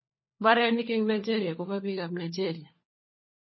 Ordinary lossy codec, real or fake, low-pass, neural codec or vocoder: MP3, 24 kbps; fake; 7.2 kHz; codec, 16 kHz, 4 kbps, FunCodec, trained on LibriTTS, 50 frames a second